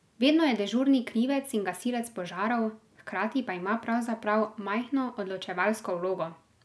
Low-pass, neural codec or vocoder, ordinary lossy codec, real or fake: none; none; none; real